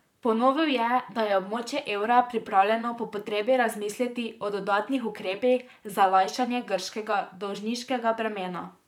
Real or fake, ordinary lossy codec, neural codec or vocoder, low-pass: fake; none; vocoder, 44.1 kHz, 128 mel bands, Pupu-Vocoder; 19.8 kHz